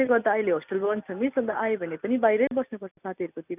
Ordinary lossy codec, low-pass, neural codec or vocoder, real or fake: none; 3.6 kHz; none; real